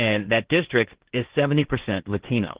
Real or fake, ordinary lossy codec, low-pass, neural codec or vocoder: fake; Opus, 16 kbps; 3.6 kHz; codec, 16 kHz, 1.1 kbps, Voila-Tokenizer